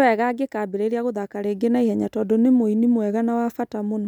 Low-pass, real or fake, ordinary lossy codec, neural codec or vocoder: 19.8 kHz; real; none; none